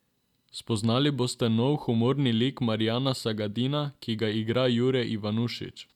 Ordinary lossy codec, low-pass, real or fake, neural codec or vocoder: none; 19.8 kHz; real; none